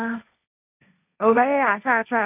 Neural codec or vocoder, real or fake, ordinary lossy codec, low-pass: codec, 16 kHz, 1.1 kbps, Voila-Tokenizer; fake; none; 3.6 kHz